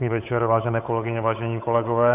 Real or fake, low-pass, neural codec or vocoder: fake; 3.6 kHz; codec, 16 kHz, 8 kbps, FunCodec, trained on Chinese and English, 25 frames a second